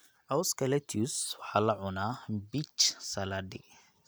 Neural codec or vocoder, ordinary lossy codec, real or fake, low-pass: none; none; real; none